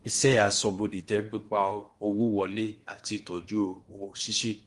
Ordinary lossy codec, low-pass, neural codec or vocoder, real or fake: Opus, 24 kbps; 10.8 kHz; codec, 16 kHz in and 24 kHz out, 0.8 kbps, FocalCodec, streaming, 65536 codes; fake